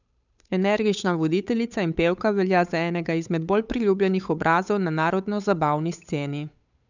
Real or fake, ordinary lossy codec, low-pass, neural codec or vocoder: fake; none; 7.2 kHz; codec, 16 kHz, 8 kbps, FunCodec, trained on Chinese and English, 25 frames a second